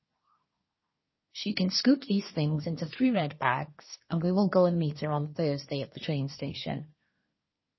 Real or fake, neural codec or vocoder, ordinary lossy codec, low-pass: fake; codec, 24 kHz, 1 kbps, SNAC; MP3, 24 kbps; 7.2 kHz